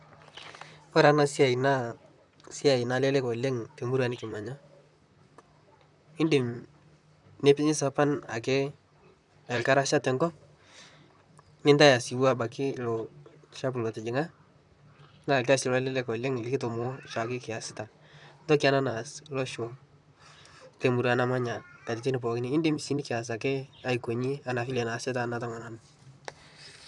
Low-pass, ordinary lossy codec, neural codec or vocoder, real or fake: 10.8 kHz; none; vocoder, 44.1 kHz, 128 mel bands, Pupu-Vocoder; fake